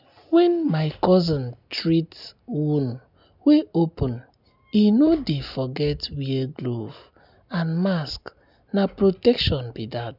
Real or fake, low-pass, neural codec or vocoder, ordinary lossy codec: real; 5.4 kHz; none; none